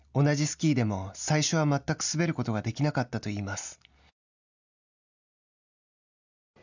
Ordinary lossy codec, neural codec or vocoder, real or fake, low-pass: none; none; real; 7.2 kHz